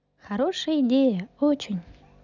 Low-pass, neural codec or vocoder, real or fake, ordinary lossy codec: 7.2 kHz; none; real; none